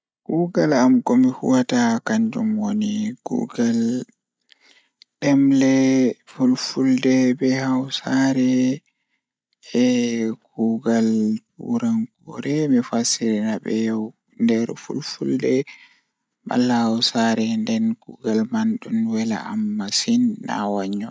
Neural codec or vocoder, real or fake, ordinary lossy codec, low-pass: none; real; none; none